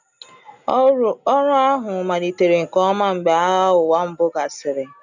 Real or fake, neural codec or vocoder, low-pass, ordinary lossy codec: real; none; 7.2 kHz; none